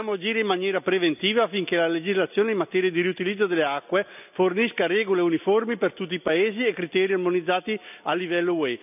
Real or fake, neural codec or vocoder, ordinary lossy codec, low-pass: real; none; none; 3.6 kHz